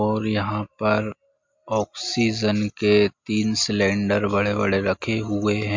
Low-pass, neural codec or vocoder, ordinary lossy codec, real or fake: 7.2 kHz; none; MP3, 48 kbps; real